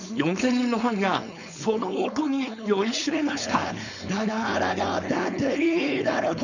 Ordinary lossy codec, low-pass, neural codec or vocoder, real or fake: none; 7.2 kHz; codec, 16 kHz, 4.8 kbps, FACodec; fake